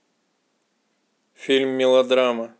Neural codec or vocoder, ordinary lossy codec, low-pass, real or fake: none; none; none; real